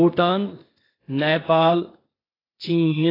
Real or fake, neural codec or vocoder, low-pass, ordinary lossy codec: fake; codec, 16 kHz, 0.8 kbps, ZipCodec; 5.4 kHz; AAC, 24 kbps